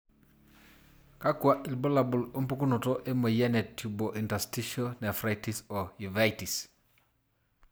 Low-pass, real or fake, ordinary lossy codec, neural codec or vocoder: none; real; none; none